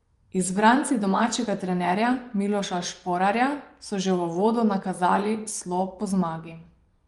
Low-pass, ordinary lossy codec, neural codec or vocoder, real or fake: 10.8 kHz; Opus, 32 kbps; vocoder, 24 kHz, 100 mel bands, Vocos; fake